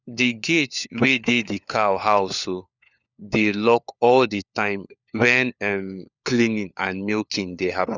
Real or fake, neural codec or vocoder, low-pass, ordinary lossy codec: fake; codec, 16 kHz, 4 kbps, FunCodec, trained on LibriTTS, 50 frames a second; 7.2 kHz; none